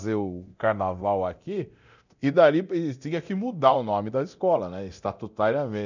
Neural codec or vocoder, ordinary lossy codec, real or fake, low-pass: codec, 24 kHz, 0.9 kbps, DualCodec; none; fake; 7.2 kHz